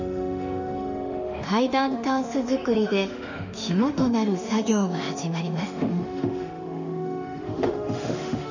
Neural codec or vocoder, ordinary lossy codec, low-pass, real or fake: autoencoder, 48 kHz, 32 numbers a frame, DAC-VAE, trained on Japanese speech; none; 7.2 kHz; fake